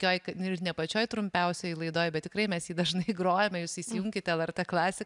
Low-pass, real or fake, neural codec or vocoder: 10.8 kHz; real; none